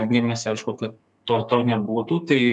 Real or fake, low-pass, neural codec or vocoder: fake; 10.8 kHz; codec, 32 kHz, 1.9 kbps, SNAC